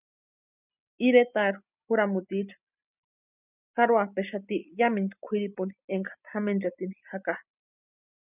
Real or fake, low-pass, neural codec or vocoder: real; 3.6 kHz; none